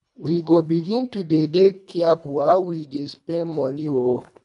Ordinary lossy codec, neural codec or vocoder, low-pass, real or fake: none; codec, 24 kHz, 1.5 kbps, HILCodec; 10.8 kHz; fake